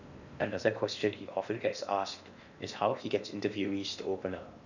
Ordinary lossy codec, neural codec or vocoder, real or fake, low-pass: none; codec, 16 kHz in and 24 kHz out, 0.8 kbps, FocalCodec, streaming, 65536 codes; fake; 7.2 kHz